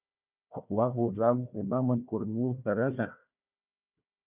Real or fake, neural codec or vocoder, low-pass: fake; codec, 16 kHz, 1 kbps, FunCodec, trained on Chinese and English, 50 frames a second; 3.6 kHz